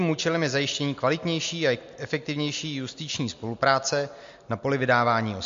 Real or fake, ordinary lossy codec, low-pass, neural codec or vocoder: real; MP3, 48 kbps; 7.2 kHz; none